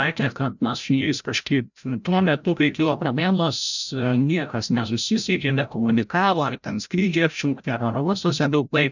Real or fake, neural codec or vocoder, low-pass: fake; codec, 16 kHz, 0.5 kbps, FreqCodec, larger model; 7.2 kHz